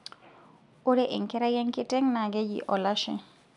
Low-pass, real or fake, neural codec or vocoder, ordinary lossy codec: 10.8 kHz; real; none; none